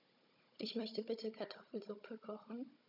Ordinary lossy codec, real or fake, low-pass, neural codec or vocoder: none; fake; 5.4 kHz; codec, 16 kHz, 16 kbps, FunCodec, trained on Chinese and English, 50 frames a second